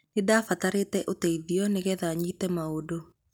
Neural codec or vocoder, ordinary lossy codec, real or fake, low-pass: none; none; real; none